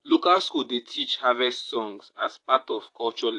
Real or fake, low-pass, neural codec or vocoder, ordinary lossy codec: fake; 10.8 kHz; vocoder, 44.1 kHz, 128 mel bands, Pupu-Vocoder; AAC, 48 kbps